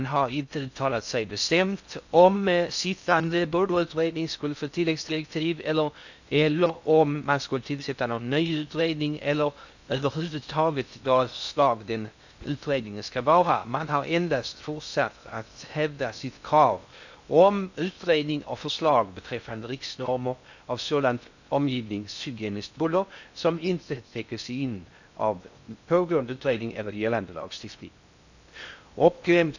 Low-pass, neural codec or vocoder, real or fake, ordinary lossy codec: 7.2 kHz; codec, 16 kHz in and 24 kHz out, 0.6 kbps, FocalCodec, streaming, 2048 codes; fake; none